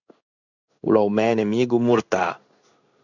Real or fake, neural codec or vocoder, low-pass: fake; codec, 16 kHz in and 24 kHz out, 1 kbps, XY-Tokenizer; 7.2 kHz